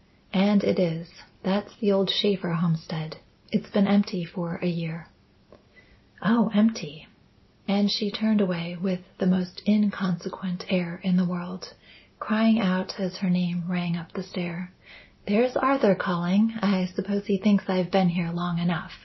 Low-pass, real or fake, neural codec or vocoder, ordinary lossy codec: 7.2 kHz; real; none; MP3, 24 kbps